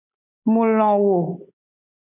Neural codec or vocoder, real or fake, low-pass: none; real; 3.6 kHz